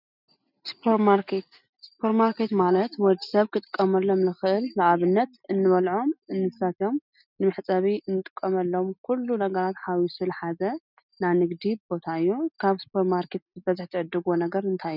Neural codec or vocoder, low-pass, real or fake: none; 5.4 kHz; real